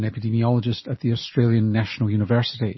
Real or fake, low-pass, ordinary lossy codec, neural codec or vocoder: real; 7.2 kHz; MP3, 24 kbps; none